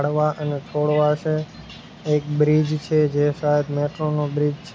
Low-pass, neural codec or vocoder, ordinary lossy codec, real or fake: none; none; none; real